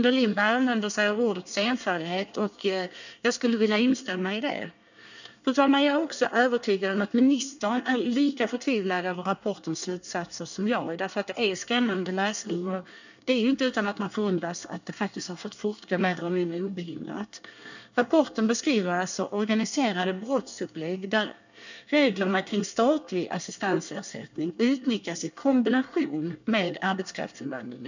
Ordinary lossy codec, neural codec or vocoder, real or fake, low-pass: none; codec, 24 kHz, 1 kbps, SNAC; fake; 7.2 kHz